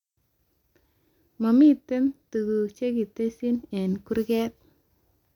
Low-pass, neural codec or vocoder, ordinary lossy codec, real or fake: 19.8 kHz; none; none; real